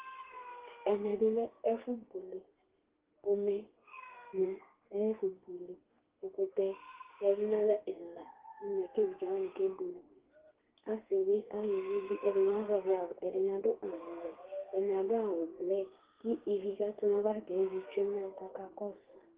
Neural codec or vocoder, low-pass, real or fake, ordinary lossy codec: codec, 32 kHz, 1.9 kbps, SNAC; 3.6 kHz; fake; Opus, 16 kbps